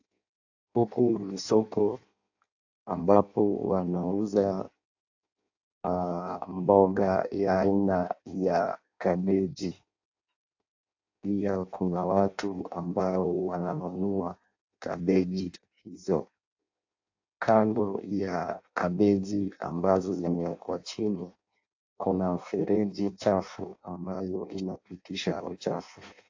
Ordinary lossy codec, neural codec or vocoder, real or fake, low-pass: AAC, 48 kbps; codec, 16 kHz in and 24 kHz out, 0.6 kbps, FireRedTTS-2 codec; fake; 7.2 kHz